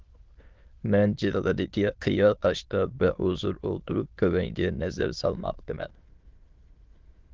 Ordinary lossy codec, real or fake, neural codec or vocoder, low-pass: Opus, 16 kbps; fake; autoencoder, 22.05 kHz, a latent of 192 numbers a frame, VITS, trained on many speakers; 7.2 kHz